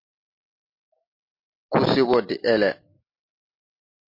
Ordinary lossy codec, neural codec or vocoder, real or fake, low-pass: MP3, 32 kbps; none; real; 5.4 kHz